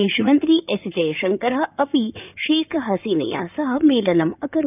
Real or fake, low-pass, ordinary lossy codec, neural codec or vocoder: fake; 3.6 kHz; none; codec, 16 kHz in and 24 kHz out, 2.2 kbps, FireRedTTS-2 codec